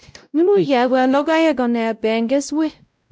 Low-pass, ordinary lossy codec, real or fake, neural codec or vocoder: none; none; fake; codec, 16 kHz, 0.5 kbps, X-Codec, WavLM features, trained on Multilingual LibriSpeech